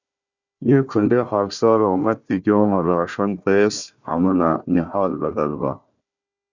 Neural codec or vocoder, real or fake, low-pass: codec, 16 kHz, 1 kbps, FunCodec, trained on Chinese and English, 50 frames a second; fake; 7.2 kHz